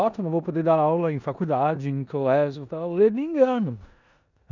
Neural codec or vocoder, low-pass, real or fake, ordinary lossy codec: codec, 16 kHz in and 24 kHz out, 0.9 kbps, LongCat-Audio-Codec, four codebook decoder; 7.2 kHz; fake; none